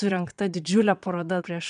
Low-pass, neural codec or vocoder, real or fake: 9.9 kHz; vocoder, 22.05 kHz, 80 mel bands, Vocos; fake